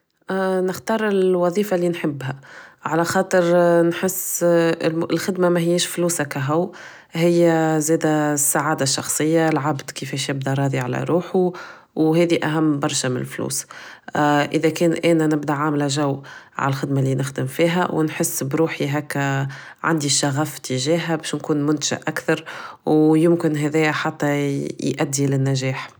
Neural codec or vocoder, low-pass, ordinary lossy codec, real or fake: none; none; none; real